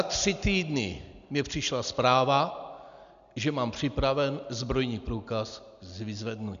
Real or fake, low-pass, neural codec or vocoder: real; 7.2 kHz; none